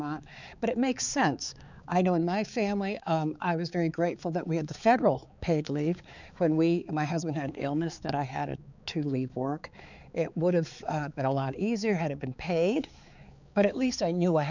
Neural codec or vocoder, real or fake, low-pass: codec, 16 kHz, 4 kbps, X-Codec, HuBERT features, trained on balanced general audio; fake; 7.2 kHz